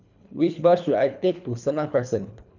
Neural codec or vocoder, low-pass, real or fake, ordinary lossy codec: codec, 24 kHz, 3 kbps, HILCodec; 7.2 kHz; fake; none